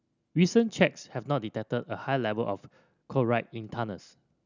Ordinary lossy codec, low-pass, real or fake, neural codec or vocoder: none; 7.2 kHz; real; none